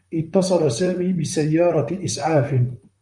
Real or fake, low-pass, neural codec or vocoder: fake; 10.8 kHz; vocoder, 44.1 kHz, 128 mel bands, Pupu-Vocoder